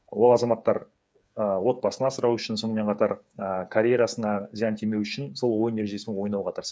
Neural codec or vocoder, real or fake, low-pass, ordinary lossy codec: codec, 16 kHz, 8 kbps, FreqCodec, smaller model; fake; none; none